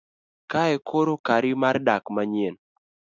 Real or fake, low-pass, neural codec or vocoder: real; 7.2 kHz; none